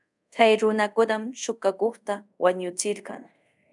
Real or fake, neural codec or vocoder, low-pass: fake; codec, 24 kHz, 0.5 kbps, DualCodec; 10.8 kHz